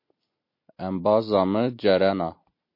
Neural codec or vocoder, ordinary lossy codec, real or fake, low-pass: none; MP3, 32 kbps; real; 5.4 kHz